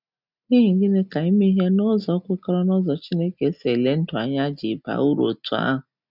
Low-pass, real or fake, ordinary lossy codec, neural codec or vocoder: 5.4 kHz; real; none; none